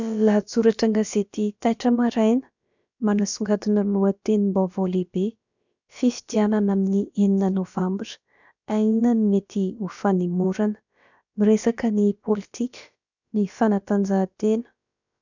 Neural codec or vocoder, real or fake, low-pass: codec, 16 kHz, about 1 kbps, DyCAST, with the encoder's durations; fake; 7.2 kHz